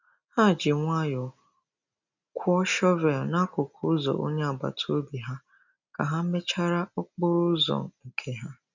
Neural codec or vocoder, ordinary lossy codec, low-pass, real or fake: none; none; 7.2 kHz; real